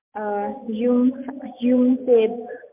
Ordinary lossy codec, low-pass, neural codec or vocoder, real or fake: MP3, 32 kbps; 3.6 kHz; none; real